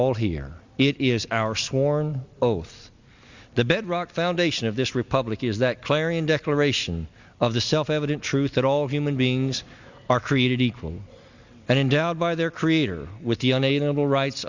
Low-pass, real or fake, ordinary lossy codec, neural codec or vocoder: 7.2 kHz; real; Opus, 64 kbps; none